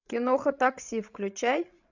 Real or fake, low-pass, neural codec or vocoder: fake; 7.2 kHz; codec, 16 kHz, 16 kbps, FreqCodec, larger model